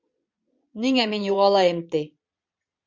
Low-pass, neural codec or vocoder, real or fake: 7.2 kHz; vocoder, 22.05 kHz, 80 mel bands, Vocos; fake